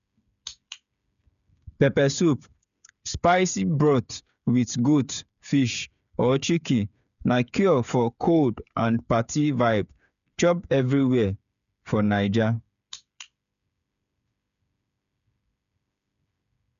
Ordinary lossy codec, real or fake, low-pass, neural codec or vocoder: none; fake; 7.2 kHz; codec, 16 kHz, 8 kbps, FreqCodec, smaller model